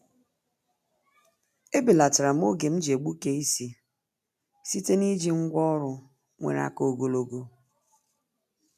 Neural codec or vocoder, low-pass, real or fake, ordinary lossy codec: none; 14.4 kHz; real; none